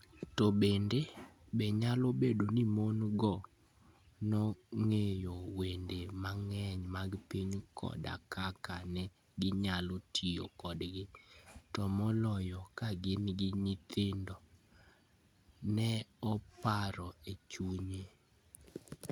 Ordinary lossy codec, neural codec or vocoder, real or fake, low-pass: none; none; real; 19.8 kHz